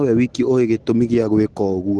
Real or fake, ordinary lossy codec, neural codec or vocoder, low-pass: real; Opus, 16 kbps; none; 10.8 kHz